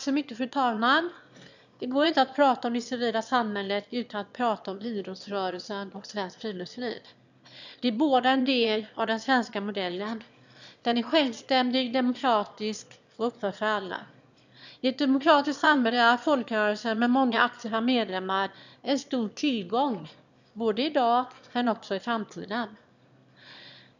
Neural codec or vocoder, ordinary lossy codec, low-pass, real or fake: autoencoder, 22.05 kHz, a latent of 192 numbers a frame, VITS, trained on one speaker; none; 7.2 kHz; fake